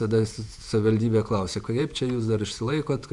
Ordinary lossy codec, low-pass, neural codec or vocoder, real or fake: MP3, 96 kbps; 10.8 kHz; vocoder, 48 kHz, 128 mel bands, Vocos; fake